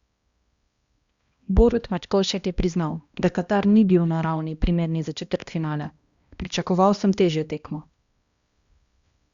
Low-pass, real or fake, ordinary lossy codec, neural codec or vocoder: 7.2 kHz; fake; Opus, 64 kbps; codec, 16 kHz, 1 kbps, X-Codec, HuBERT features, trained on balanced general audio